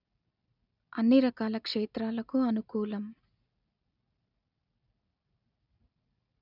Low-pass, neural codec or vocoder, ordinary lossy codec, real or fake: 5.4 kHz; none; none; real